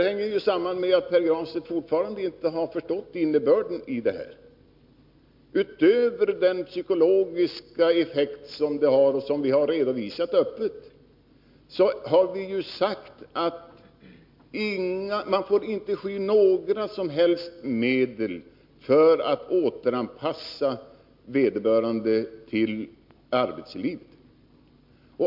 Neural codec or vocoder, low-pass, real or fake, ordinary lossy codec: none; 5.4 kHz; real; MP3, 48 kbps